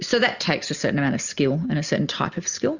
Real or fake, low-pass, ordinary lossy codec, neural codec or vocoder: real; 7.2 kHz; Opus, 64 kbps; none